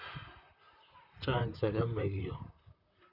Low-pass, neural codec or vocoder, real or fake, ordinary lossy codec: 5.4 kHz; vocoder, 44.1 kHz, 128 mel bands, Pupu-Vocoder; fake; none